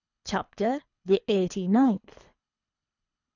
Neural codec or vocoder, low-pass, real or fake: codec, 24 kHz, 3 kbps, HILCodec; 7.2 kHz; fake